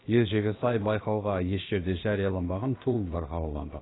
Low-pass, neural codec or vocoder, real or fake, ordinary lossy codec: 7.2 kHz; codec, 16 kHz, about 1 kbps, DyCAST, with the encoder's durations; fake; AAC, 16 kbps